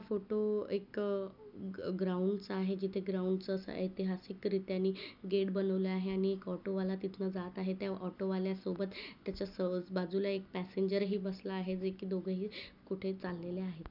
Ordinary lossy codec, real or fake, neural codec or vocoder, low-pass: none; real; none; 5.4 kHz